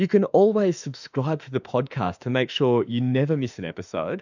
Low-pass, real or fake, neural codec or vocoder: 7.2 kHz; fake; autoencoder, 48 kHz, 32 numbers a frame, DAC-VAE, trained on Japanese speech